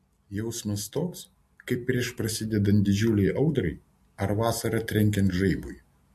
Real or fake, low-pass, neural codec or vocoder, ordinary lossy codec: real; 14.4 kHz; none; MP3, 64 kbps